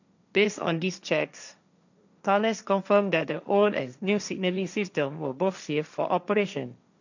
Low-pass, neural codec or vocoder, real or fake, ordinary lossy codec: 7.2 kHz; codec, 16 kHz, 1.1 kbps, Voila-Tokenizer; fake; none